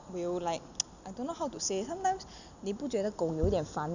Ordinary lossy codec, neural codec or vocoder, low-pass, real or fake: none; none; 7.2 kHz; real